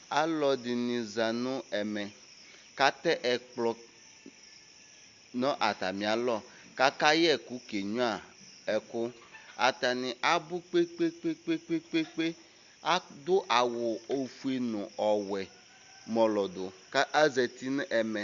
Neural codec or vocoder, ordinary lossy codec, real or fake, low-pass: none; Opus, 64 kbps; real; 7.2 kHz